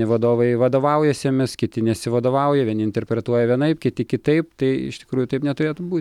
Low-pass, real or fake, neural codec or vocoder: 19.8 kHz; real; none